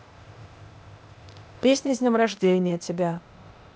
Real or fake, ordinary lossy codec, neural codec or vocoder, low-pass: fake; none; codec, 16 kHz, 0.8 kbps, ZipCodec; none